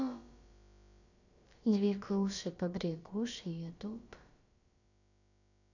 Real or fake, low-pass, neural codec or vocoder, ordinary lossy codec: fake; 7.2 kHz; codec, 16 kHz, about 1 kbps, DyCAST, with the encoder's durations; none